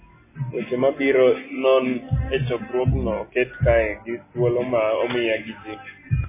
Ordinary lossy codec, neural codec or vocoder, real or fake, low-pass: MP3, 16 kbps; none; real; 3.6 kHz